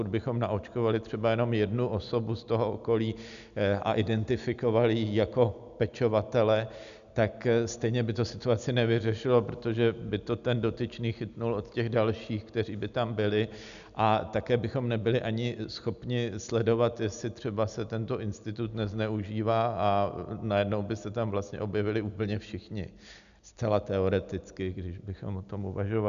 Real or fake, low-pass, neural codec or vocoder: real; 7.2 kHz; none